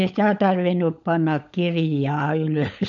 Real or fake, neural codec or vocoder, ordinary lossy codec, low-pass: fake; codec, 16 kHz, 16 kbps, FunCodec, trained on LibriTTS, 50 frames a second; none; 7.2 kHz